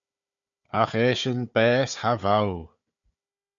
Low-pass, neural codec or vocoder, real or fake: 7.2 kHz; codec, 16 kHz, 4 kbps, FunCodec, trained on Chinese and English, 50 frames a second; fake